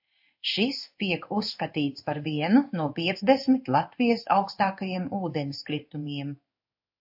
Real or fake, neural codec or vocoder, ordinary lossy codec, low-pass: fake; codec, 16 kHz in and 24 kHz out, 1 kbps, XY-Tokenizer; AAC, 48 kbps; 5.4 kHz